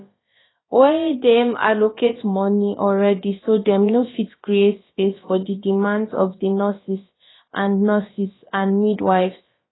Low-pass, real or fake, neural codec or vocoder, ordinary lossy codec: 7.2 kHz; fake; codec, 16 kHz, about 1 kbps, DyCAST, with the encoder's durations; AAC, 16 kbps